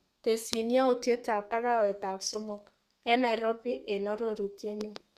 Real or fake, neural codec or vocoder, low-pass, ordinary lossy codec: fake; codec, 32 kHz, 1.9 kbps, SNAC; 14.4 kHz; Opus, 64 kbps